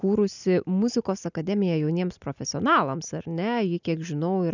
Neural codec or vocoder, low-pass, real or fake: none; 7.2 kHz; real